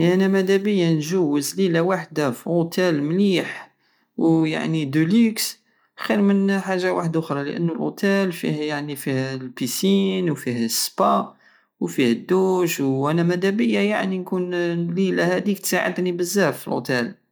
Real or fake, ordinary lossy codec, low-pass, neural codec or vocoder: real; none; none; none